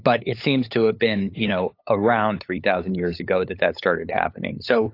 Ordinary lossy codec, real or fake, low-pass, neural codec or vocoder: AAC, 32 kbps; fake; 5.4 kHz; codec, 16 kHz, 8 kbps, FunCodec, trained on LibriTTS, 25 frames a second